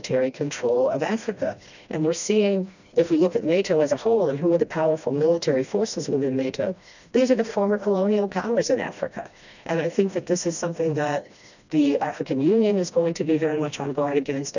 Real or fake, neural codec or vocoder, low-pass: fake; codec, 16 kHz, 1 kbps, FreqCodec, smaller model; 7.2 kHz